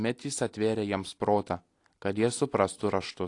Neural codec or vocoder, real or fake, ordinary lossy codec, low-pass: none; real; AAC, 48 kbps; 10.8 kHz